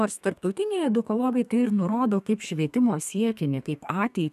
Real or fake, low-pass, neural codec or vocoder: fake; 14.4 kHz; codec, 32 kHz, 1.9 kbps, SNAC